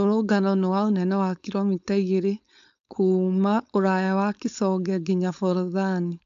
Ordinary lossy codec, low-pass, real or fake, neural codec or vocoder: AAC, 64 kbps; 7.2 kHz; fake; codec, 16 kHz, 4.8 kbps, FACodec